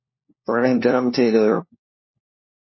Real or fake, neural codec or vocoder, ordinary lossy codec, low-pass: fake; codec, 16 kHz, 1 kbps, FunCodec, trained on LibriTTS, 50 frames a second; MP3, 24 kbps; 7.2 kHz